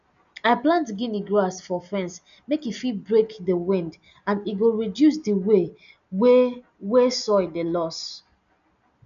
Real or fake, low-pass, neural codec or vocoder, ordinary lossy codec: real; 7.2 kHz; none; none